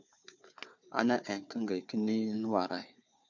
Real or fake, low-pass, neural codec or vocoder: fake; 7.2 kHz; codec, 16 kHz, 4 kbps, FunCodec, trained on Chinese and English, 50 frames a second